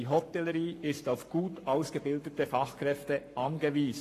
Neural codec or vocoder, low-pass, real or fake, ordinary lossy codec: codec, 44.1 kHz, 7.8 kbps, Pupu-Codec; 14.4 kHz; fake; AAC, 48 kbps